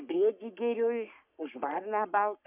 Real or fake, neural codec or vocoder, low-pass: fake; codec, 44.1 kHz, 3.4 kbps, Pupu-Codec; 3.6 kHz